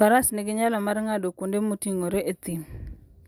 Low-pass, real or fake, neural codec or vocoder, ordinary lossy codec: none; real; none; none